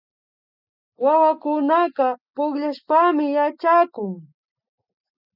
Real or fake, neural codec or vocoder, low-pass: real; none; 5.4 kHz